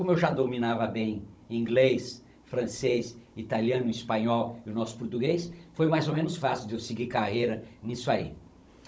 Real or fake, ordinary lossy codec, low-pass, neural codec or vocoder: fake; none; none; codec, 16 kHz, 16 kbps, FunCodec, trained on Chinese and English, 50 frames a second